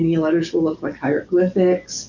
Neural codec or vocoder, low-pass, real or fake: codec, 44.1 kHz, 7.8 kbps, DAC; 7.2 kHz; fake